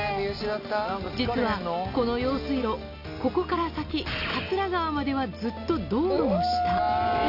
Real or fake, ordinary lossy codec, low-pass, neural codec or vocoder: real; none; 5.4 kHz; none